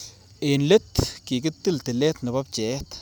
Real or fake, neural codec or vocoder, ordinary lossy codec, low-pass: real; none; none; none